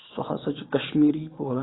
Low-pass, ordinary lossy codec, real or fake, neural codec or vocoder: 7.2 kHz; AAC, 16 kbps; fake; vocoder, 22.05 kHz, 80 mel bands, Vocos